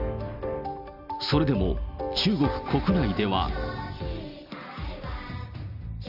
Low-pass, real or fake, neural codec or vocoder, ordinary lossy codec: 5.4 kHz; real; none; none